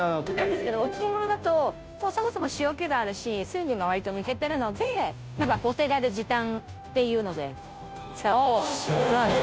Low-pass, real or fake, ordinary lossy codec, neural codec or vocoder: none; fake; none; codec, 16 kHz, 0.5 kbps, FunCodec, trained on Chinese and English, 25 frames a second